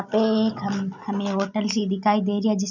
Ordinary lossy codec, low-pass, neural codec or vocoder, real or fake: none; 7.2 kHz; none; real